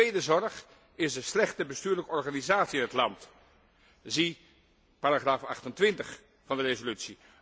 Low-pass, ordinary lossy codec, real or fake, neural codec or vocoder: none; none; real; none